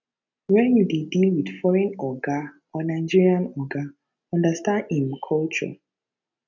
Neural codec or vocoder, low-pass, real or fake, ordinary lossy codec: none; 7.2 kHz; real; none